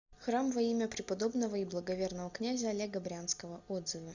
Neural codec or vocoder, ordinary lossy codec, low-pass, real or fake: none; Opus, 64 kbps; 7.2 kHz; real